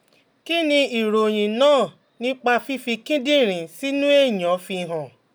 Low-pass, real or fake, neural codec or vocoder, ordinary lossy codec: none; real; none; none